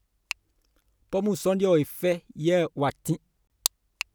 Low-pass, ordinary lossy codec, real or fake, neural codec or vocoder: none; none; real; none